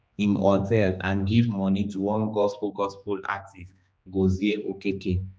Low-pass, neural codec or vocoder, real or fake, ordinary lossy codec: none; codec, 16 kHz, 2 kbps, X-Codec, HuBERT features, trained on general audio; fake; none